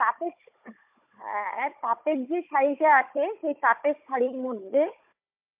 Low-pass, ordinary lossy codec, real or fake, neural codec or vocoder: 3.6 kHz; MP3, 32 kbps; fake; codec, 16 kHz, 16 kbps, FunCodec, trained on Chinese and English, 50 frames a second